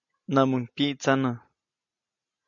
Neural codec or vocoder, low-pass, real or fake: none; 7.2 kHz; real